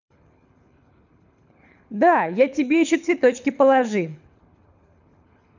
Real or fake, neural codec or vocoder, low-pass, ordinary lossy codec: fake; codec, 24 kHz, 6 kbps, HILCodec; 7.2 kHz; none